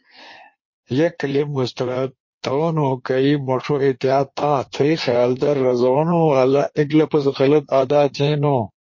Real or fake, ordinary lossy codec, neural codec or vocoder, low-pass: fake; MP3, 32 kbps; codec, 16 kHz in and 24 kHz out, 1.1 kbps, FireRedTTS-2 codec; 7.2 kHz